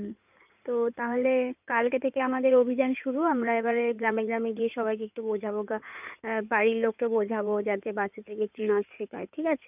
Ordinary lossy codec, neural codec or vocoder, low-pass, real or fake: none; codec, 16 kHz in and 24 kHz out, 2.2 kbps, FireRedTTS-2 codec; 3.6 kHz; fake